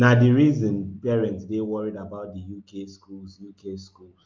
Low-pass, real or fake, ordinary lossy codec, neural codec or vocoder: 7.2 kHz; real; Opus, 32 kbps; none